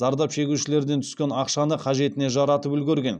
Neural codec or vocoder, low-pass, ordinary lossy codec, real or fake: none; none; none; real